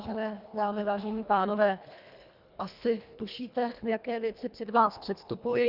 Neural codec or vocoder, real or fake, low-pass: codec, 24 kHz, 1.5 kbps, HILCodec; fake; 5.4 kHz